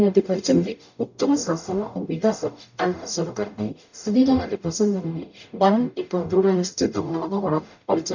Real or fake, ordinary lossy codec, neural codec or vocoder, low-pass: fake; none; codec, 44.1 kHz, 0.9 kbps, DAC; 7.2 kHz